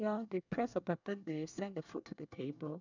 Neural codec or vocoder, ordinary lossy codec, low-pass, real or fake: codec, 24 kHz, 1 kbps, SNAC; none; 7.2 kHz; fake